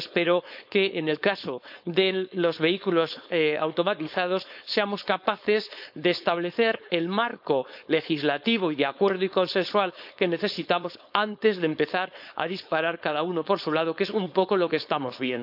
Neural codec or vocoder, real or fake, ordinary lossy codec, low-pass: codec, 16 kHz, 4.8 kbps, FACodec; fake; none; 5.4 kHz